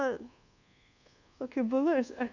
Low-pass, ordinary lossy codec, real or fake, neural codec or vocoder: 7.2 kHz; none; fake; codec, 24 kHz, 1.2 kbps, DualCodec